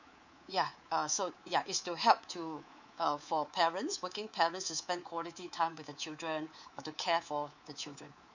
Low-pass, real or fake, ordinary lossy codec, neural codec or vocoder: 7.2 kHz; fake; none; codec, 24 kHz, 3.1 kbps, DualCodec